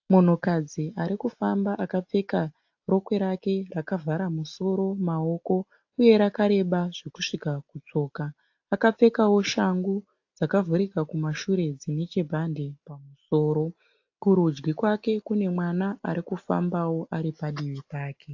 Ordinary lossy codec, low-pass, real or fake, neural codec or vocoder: AAC, 48 kbps; 7.2 kHz; real; none